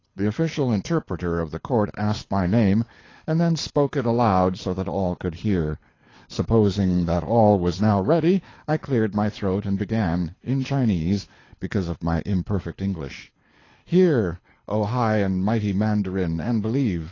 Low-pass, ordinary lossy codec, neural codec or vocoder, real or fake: 7.2 kHz; AAC, 32 kbps; codec, 24 kHz, 6 kbps, HILCodec; fake